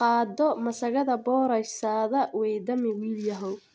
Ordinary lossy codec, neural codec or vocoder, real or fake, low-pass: none; none; real; none